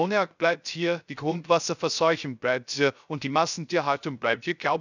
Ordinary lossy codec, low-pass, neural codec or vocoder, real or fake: none; 7.2 kHz; codec, 16 kHz, 0.3 kbps, FocalCodec; fake